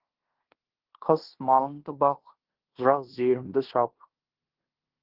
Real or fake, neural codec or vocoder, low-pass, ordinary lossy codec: fake; codec, 16 kHz in and 24 kHz out, 0.9 kbps, LongCat-Audio-Codec, fine tuned four codebook decoder; 5.4 kHz; Opus, 16 kbps